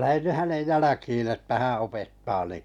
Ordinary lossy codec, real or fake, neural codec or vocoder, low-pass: none; real; none; 19.8 kHz